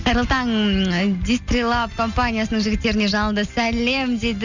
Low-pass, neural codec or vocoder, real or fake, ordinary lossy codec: 7.2 kHz; none; real; none